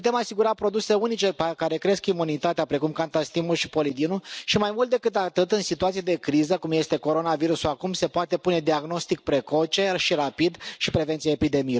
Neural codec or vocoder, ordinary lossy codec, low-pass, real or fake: none; none; none; real